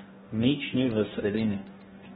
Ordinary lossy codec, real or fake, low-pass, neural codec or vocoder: AAC, 16 kbps; fake; 19.8 kHz; codec, 44.1 kHz, 2.6 kbps, DAC